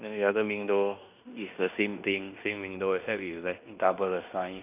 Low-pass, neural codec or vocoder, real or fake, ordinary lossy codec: 3.6 kHz; codec, 16 kHz in and 24 kHz out, 0.9 kbps, LongCat-Audio-Codec, fine tuned four codebook decoder; fake; none